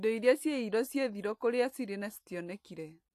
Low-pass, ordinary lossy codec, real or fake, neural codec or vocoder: 14.4 kHz; AAC, 64 kbps; real; none